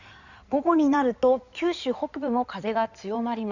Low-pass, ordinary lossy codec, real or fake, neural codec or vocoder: 7.2 kHz; none; fake; codec, 16 kHz in and 24 kHz out, 2.2 kbps, FireRedTTS-2 codec